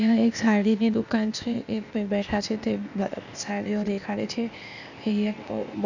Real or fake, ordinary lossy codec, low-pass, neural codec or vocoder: fake; none; 7.2 kHz; codec, 16 kHz, 0.8 kbps, ZipCodec